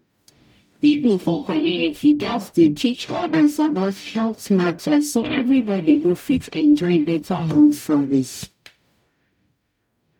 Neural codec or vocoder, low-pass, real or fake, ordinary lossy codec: codec, 44.1 kHz, 0.9 kbps, DAC; 19.8 kHz; fake; none